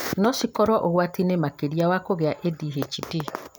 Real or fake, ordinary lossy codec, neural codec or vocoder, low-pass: real; none; none; none